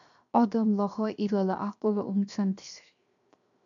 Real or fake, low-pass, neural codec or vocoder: fake; 7.2 kHz; codec, 16 kHz, 0.7 kbps, FocalCodec